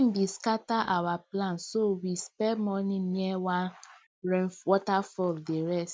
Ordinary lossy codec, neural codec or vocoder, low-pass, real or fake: none; none; none; real